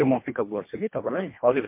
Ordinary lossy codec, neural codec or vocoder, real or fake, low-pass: MP3, 24 kbps; codec, 24 kHz, 1.5 kbps, HILCodec; fake; 3.6 kHz